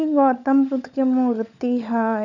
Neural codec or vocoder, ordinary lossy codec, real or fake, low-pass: codec, 16 kHz, 16 kbps, FunCodec, trained on LibriTTS, 50 frames a second; none; fake; 7.2 kHz